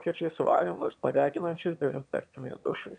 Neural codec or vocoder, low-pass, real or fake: autoencoder, 22.05 kHz, a latent of 192 numbers a frame, VITS, trained on one speaker; 9.9 kHz; fake